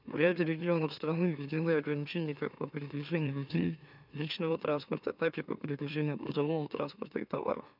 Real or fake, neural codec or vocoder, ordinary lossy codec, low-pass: fake; autoencoder, 44.1 kHz, a latent of 192 numbers a frame, MeloTTS; none; 5.4 kHz